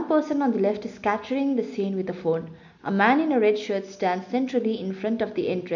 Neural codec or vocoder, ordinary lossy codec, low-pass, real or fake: none; none; 7.2 kHz; real